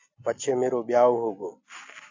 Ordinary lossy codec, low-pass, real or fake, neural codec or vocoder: AAC, 48 kbps; 7.2 kHz; real; none